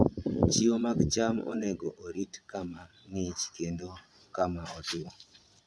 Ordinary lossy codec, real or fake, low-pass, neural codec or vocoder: none; fake; none; vocoder, 22.05 kHz, 80 mel bands, Vocos